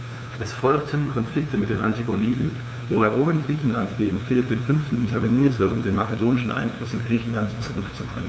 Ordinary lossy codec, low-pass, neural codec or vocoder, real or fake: none; none; codec, 16 kHz, 2 kbps, FunCodec, trained on LibriTTS, 25 frames a second; fake